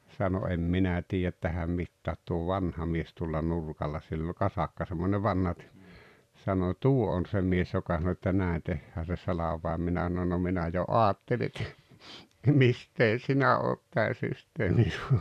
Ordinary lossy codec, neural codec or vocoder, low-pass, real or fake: none; none; 14.4 kHz; real